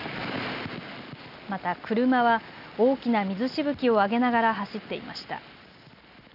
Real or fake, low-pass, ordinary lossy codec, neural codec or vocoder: real; 5.4 kHz; none; none